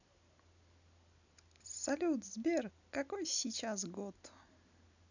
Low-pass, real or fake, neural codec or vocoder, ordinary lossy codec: 7.2 kHz; real; none; none